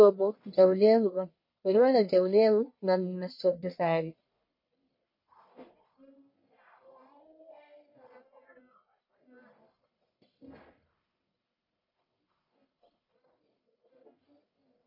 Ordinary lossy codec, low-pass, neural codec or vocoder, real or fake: MP3, 32 kbps; 5.4 kHz; codec, 44.1 kHz, 1.7 kbps, Pupu-Codec; fake